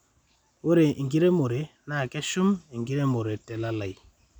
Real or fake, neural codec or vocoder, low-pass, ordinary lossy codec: real; none; 19.8 kHz; none